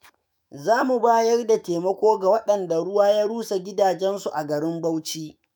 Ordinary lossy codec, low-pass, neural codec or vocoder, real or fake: none; none; autoencoder, 48 kHz, 128 numbers a frame, DAC-VAE, trained on Japanese speech; fake